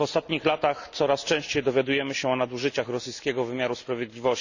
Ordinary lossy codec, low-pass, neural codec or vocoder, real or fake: none; 7.2 kHz; none; real